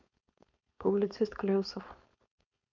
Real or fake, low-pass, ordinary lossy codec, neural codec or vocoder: fake; 7.2 kHz; none; codec, 16 kHz, 4.8 kbps, FACodec